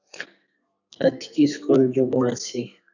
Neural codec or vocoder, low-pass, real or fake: codec, 32 kHz, 1.9 kbps, SNAC; 7.2 kHz; fake